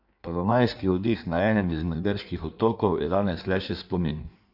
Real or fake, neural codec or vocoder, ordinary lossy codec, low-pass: fake; codec, 16 kHz in and 24 kHz out, 1.1 kbps, FireRedTTS-2 codec; none; 5.4 kHz